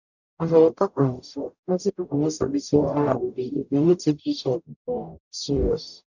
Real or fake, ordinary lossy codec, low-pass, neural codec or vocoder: fake; none; 7.2 kHz; codec, 44.1 kHz, 0.9 kbps, DAC